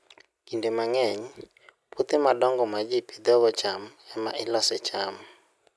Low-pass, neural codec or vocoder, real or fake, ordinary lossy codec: none; none; real; none